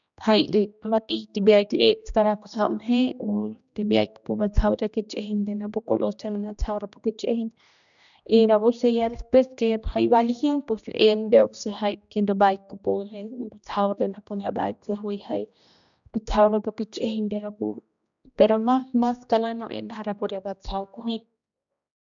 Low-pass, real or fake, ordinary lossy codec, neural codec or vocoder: 7.2 kHz; fake; none; codec, 16 kHz, 1 kbps, X-Codec, HuBERT features, trained on general audio